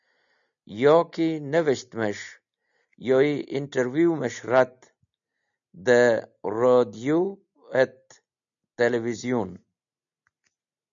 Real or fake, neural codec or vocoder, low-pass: real; none; 7.2 kHz